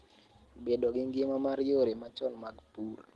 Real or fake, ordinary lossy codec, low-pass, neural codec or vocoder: real; Opus, 16 kbps; 10.8 kHz; none